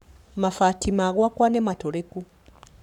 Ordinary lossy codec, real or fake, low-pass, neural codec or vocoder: none; fake; 19.8 kHz; codec, 44.1 kHz, 7.8 kbps, Pupu-Codec